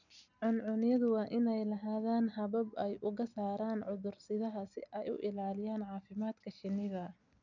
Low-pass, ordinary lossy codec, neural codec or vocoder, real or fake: 7.2 kHz; none; none; real